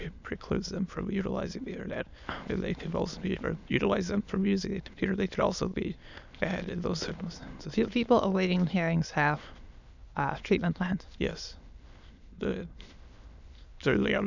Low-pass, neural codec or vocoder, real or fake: 7.2 kHz; autoencoder, 22.05 kHz, a latent of 192 numbers a frame, VITS, trained on many speakers; fake